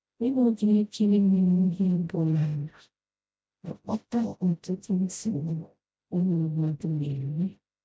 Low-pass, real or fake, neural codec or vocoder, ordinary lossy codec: none; fake; codec, 16 kHz, 0.5 kbps, FreqCodec, smaller model; none